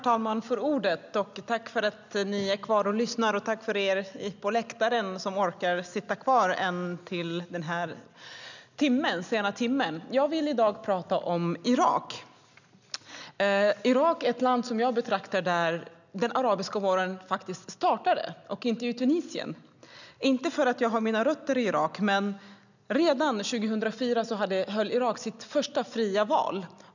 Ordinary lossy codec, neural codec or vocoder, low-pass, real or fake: none; none; 7.2 kHz; real